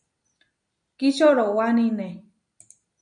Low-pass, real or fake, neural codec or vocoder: 9.9 kHz; real; none